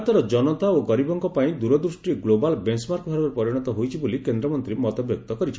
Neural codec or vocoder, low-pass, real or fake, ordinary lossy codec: none; none; real; none